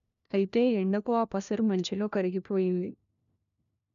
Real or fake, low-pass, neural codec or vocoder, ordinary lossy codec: fake; 7.2 kHz; codec, 16 kHz, 1 kbps, FunCodec, trained on LibriTTS, 50 frames a second; none